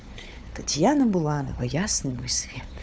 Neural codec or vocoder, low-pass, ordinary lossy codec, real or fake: codec, 16 kHz, 4 kbps, FunCodec, trained on Chinese and English, 50 frames a second; none; none; fake